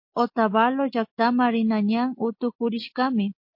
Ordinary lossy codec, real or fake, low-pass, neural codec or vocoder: MP3, 32 kbps; real; 5.4 kHz; none